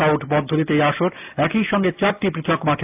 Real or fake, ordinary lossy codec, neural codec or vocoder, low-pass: real; AAC, 32 kbps; none; 3.6 kHz